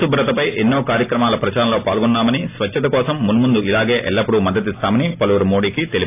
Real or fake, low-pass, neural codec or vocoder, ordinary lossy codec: real; 3.6 kHz; none; AAC, 24 kbps